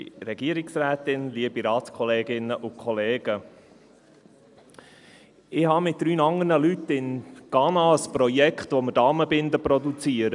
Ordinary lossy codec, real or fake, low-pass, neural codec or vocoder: none; real; 10.8 kHz; none